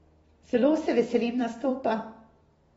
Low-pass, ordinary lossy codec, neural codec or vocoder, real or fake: 19.8 kHz; AAC, 24 kbps; vocoder, 44.1 kHz, 128 mel bands every 256 samples, BigVGAN v2; fake